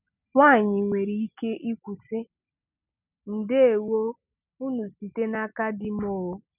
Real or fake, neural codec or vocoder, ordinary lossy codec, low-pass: real; none; none; 3.6 kHz